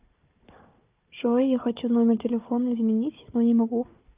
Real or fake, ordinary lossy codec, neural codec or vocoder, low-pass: fake; Opus, 24 kbps; codec, 16 kHz, 4 kbps, FunCodec, trained on Chinese and English, 50 frames a second; 3.6 kHz